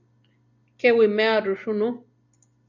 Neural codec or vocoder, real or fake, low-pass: none; real; 7.2 kHz